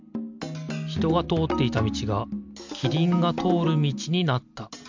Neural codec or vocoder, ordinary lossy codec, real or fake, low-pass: none; MP3, 64 kbps; real; 7.2 kHz